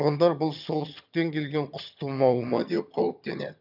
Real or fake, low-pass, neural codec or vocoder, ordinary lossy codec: fake; 5.4 kHz; vocoder, 22.05 kHz, 80 mel bands, HiFi-GAN; none